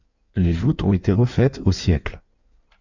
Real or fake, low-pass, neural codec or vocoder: fake; 7.2 kHz; codec, 16 kHz in and 24 kHz out, 1.1 kbps, FireRedTTS-2 codec